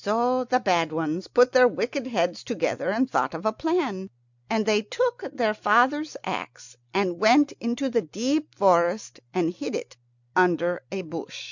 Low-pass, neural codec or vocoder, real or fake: 7.2 kHz; none; real